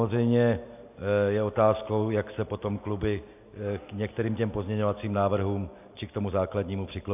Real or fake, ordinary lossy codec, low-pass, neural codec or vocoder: real; AAC, 32 kbps; 3.6 kHz; none